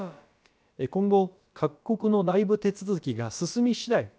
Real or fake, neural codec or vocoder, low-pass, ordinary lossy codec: fake; codec, 16 kHz, about 1 kbps, DyCAST, with the encoder's durations; none; none